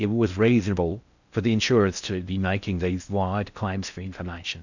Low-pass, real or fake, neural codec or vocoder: 7.2 kHz; fake; codec, 16 kHz in and 24 kHz out, 0.6 kbps, FocalCodec, streaming, 4096 codes